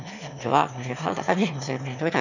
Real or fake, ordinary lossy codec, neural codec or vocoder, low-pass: fake; none; autoencoder, 22.05 kHz, a latent of 192 numbers a frame, VITS, trained on one speaker; 7.2 kHz